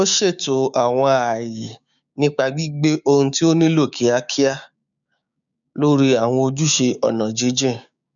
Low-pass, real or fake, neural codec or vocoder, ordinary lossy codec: 7.2 kHz; fake; codec, 16 kHz, 6 kbps, DAC; none